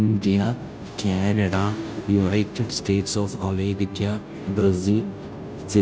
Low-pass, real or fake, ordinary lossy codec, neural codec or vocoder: none; fake; none; codec, 16 kHz, 0.5 kbps, FunCodec, trained on Chinese and English, 25 frames a second